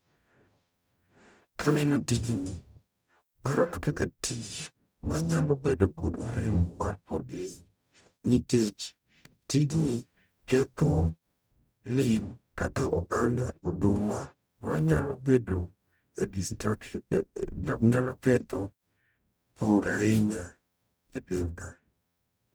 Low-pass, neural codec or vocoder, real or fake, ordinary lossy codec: none; codec, 44.1 kHz, 0.9 kbps, DAC; fake; none